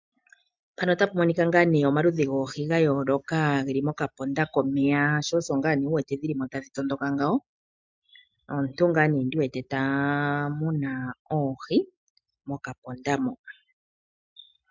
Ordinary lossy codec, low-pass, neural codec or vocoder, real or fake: MP3, 64 kbps; 7.2 kHz; none; real